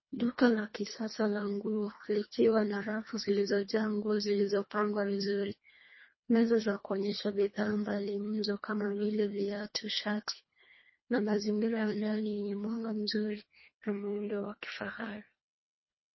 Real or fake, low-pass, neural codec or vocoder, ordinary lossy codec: fake; 7.2 kHz; codec, 24 kHz, 1.5 kbps, HILCodec; MP3, 24 kbps